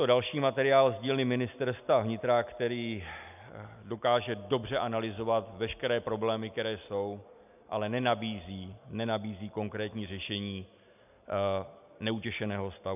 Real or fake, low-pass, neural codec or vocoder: real; 3.6 kHz; none